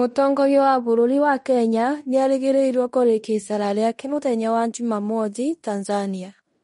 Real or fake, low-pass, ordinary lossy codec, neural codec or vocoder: fake; 10.8 kHz; MP3, 48 kbps; codec, 16 kHz in and 24 kHz out, 0.9 kbps, LongCat-Audio-Codec, fine tuned four codebook decoder